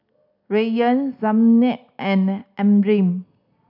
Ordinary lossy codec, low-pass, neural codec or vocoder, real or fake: none; 5.4 kHz; none; real